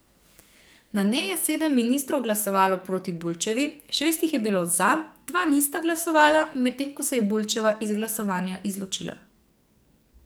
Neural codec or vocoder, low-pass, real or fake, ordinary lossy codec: codec, 44.1 kHz, 2.6 kbps, SNAC; none; fake; none